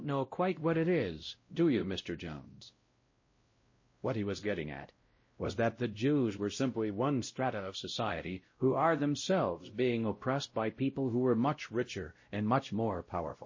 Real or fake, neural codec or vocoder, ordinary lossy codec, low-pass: fake; codec, 16 kHz, 0.5 kbps, X-Codec, WavLM features, trained on Multilingual LibriSpeech; MP3, 32 kbps; 7.2 kHz